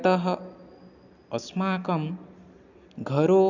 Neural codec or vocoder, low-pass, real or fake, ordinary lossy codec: none; 7.2 kHz; real; none